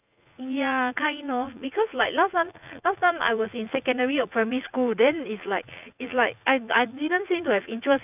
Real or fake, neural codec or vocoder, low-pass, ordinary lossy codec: fake; vocoder, 44.1 kHz, 80 mel bands, Vocos; 3.6 kHz; none